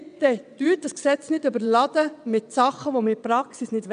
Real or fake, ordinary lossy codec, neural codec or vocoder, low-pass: fake; none; vocoder, 22.05 kHz, 80 mel bands, WaveNeXt; 9.9 kHz